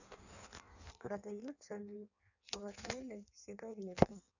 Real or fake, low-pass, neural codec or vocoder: fake; 7.2 kHz; codec, 16 kHz in and 24 kHz out, 1.1 kbps, FireRedTTS-2 codec